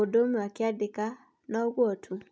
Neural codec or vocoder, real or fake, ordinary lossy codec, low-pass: none; real; none; none